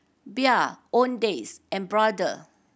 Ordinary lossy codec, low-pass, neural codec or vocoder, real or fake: none; none; none; real